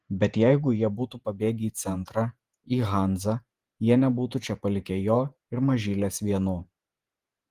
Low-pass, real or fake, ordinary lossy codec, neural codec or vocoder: 14.4 kHz; fake; Opus, 24 kbps; vocoder, 48 kHz, 128 mel bands, Vocos